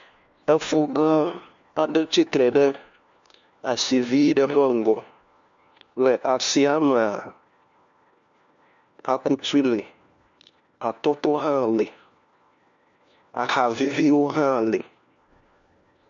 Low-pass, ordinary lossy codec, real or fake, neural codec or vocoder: 7.2 kHz; MP3, 64 kbps; fake; codec, 16 kHz, 1 kbps, FunCodec, trained on LibriTTS, 50 frames a second